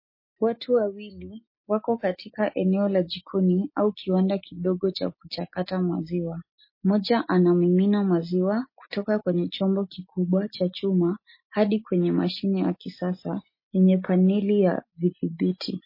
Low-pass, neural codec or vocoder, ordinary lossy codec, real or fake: 5.4 kHz; none; MP3, 24 kbps; real